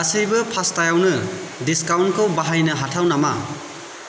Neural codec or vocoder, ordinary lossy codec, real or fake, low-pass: none; none; real; none